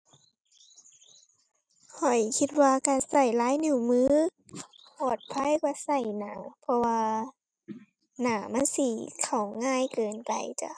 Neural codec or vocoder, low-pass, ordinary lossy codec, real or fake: none; 9.9 kHz; none; real